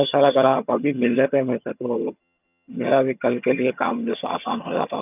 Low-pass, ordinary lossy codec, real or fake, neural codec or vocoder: 3.6 kHz; none; fake; vocoder, 22.05 kHz, 80 mel bands, HiFi-GAN